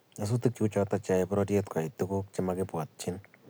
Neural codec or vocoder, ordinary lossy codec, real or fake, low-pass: none; none; real; none